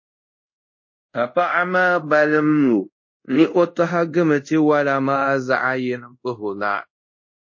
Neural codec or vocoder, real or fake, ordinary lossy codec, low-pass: codec, 24 kHz, 0.5 kbps, DualCodec; fake; MP3, 32 kbps; 7.2 kHz